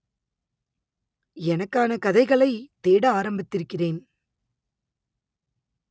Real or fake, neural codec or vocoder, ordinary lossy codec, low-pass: real; none; none; none